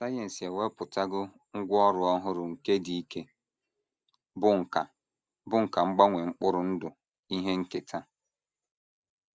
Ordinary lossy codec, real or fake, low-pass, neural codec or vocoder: none; real; none; none